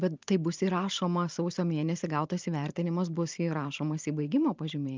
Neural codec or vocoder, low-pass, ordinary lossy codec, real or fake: vocoder, 44.1 kHz, 128 mel bands every 512 samples, BigVGAN v2; 7.2 kHz; Opus, 24 kbps; fake